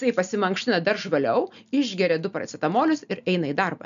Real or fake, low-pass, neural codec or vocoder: real; 7.2 kHz; none